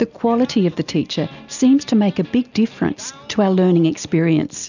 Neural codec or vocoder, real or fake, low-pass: none; real; 7.2 kHz